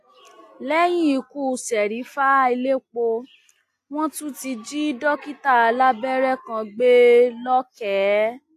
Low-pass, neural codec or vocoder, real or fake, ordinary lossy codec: 14.4 kHz; none; real; AAC, 64 kbps